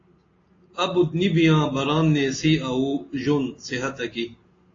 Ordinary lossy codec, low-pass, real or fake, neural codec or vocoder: AAC, 32 kbps; 7.2 kHz; real; none